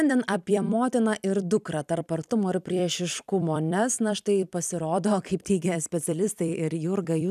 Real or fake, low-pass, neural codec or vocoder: fake; 14.4 kHz; vocoder, 44.1 kHz, 128 mel bands every 256 samples, BigVGAN v2